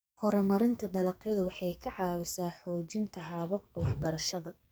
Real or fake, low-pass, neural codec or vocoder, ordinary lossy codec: fake; none; codec, 44.1 kHz, 2.6 kbps, SNAC; none